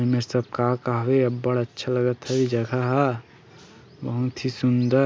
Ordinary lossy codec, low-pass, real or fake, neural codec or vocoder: none; none; real; none